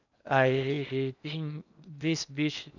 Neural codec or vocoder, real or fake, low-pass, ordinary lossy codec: codec, 16 kHz in and 24 kHz out, 0.8 kbps, FocalCodec, streaming, 65536 codes; fake; 7.2 kHz; none